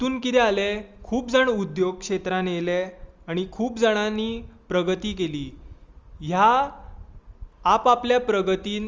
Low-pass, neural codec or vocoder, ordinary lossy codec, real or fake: 7.2 kHz; none; Opus, 32 kbps; real